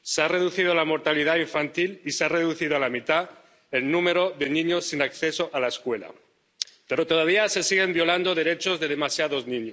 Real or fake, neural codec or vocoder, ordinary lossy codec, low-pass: real; none; none; none